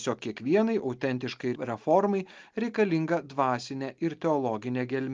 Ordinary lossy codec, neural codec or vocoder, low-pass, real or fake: Opus, 32 kbps; none; 7.2 kHz; real